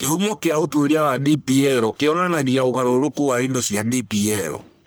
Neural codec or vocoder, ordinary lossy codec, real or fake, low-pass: codec, 44.1 kHz, 1.7 kbps, Pupu-Codec; none; fake; none